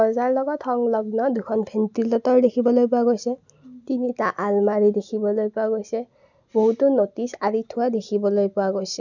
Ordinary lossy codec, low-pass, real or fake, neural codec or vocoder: none; 7.2 kHz; real; none